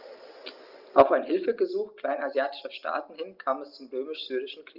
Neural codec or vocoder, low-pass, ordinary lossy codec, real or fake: none; 5.4 kHz; Opus, 24 kbps; real